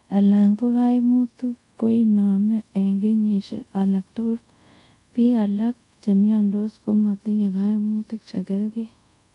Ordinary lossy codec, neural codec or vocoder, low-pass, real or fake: none; codec, 24 kHz, 0.5 kbps, DualCodec; 10.8 kHz; fake